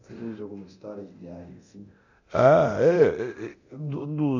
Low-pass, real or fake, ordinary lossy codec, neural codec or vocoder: 7.2 kHz; fake; none; codec, 24 kHz, 0.9 kbps, DualCodec